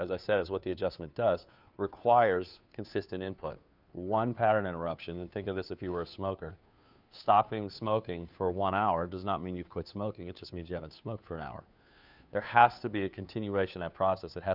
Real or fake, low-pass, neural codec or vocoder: fake; 5.4 kHz; codec, 16 kHz, 2 kbps, FunCodec, trained on Chinese and English, 25 frames a second